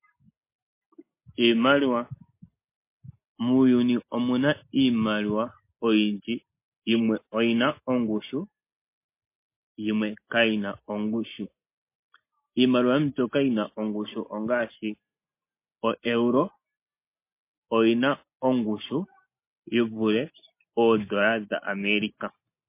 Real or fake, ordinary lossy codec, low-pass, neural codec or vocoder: real; MP3, 24 kbps; 3.6 kHz; none